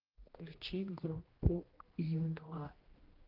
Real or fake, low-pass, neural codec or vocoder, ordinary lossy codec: fake; 5.4 kHz; codec, 16 kHz, 1 kbps, X-Codec, HuBERT features, trained on general audio; Opus, 16 kbps